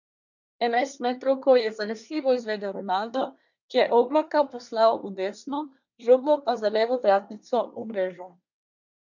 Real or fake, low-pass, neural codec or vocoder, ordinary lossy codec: fake; 7.2 kHz; codec, 24 kHz, 1 kbps, SNAC; none